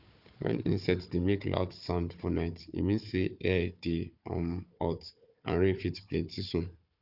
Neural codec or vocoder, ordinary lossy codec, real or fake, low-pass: codec, 16 kHz, 4 kbps, FunCodec, trained on Chinese and English, 50 frames a second; none; fake; 5.4 kHz